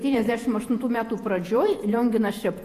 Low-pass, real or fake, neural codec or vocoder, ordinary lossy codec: 14.4 kHz; fake; vocoder, 48 kHz, 128 mel bands, Vocos; AAC, 64 kbps